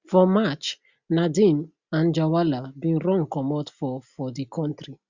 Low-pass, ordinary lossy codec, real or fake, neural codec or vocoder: 7.2 kHz; Opus, 64 kbps; real; none